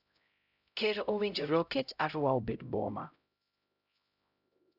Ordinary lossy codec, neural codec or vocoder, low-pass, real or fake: none; codec, 16 kHz, 0.5 kbps, X-Codec, HuBERT features, trained on LibriSpeech; 5.4 kHz; fake